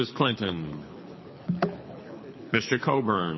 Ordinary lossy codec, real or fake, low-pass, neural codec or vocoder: MP3, 24 kbps; fake; 7.2 kHz; codec, 24 kHz, 6 kbps, HILCodec